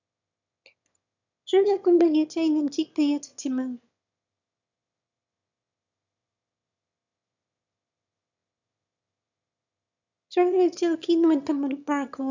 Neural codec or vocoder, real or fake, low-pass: autoencoder, 22.05 kHz, a latent of 192 numbers a frame, VITS, trained on one speaker; fake; 7.2 kHz